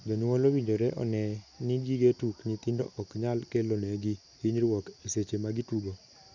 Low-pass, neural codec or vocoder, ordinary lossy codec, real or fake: 7.2 kHz; none; none; real